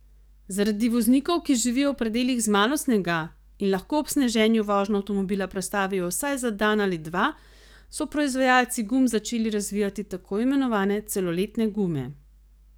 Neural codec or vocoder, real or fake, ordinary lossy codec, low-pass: codec, 44.1 kHz, 7.8 kbps, DAC; fake; none; none